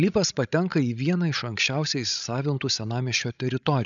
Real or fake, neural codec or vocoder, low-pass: fake; codec, 16 kHz, 16 kbps, FreqCodec, larger model; 7.2 kHz